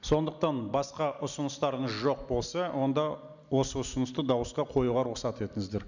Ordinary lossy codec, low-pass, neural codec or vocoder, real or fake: none; 7.2 kHz; none; real